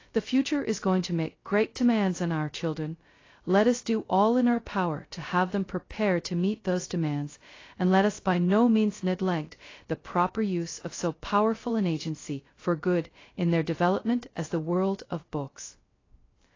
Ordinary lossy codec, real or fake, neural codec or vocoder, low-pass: AAC, 32 kbps; fake; codec, 16 kHz, 0.2 kbps, FocalCodec; 7.2 kHz